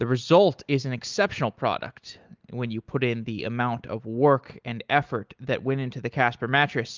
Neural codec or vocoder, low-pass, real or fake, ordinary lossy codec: none; 7.2 kHz; real; Opus, 24 kbps